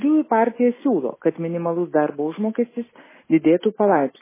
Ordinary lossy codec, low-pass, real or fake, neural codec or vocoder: MP3, 16 kbps; 3.6 kHz; real; none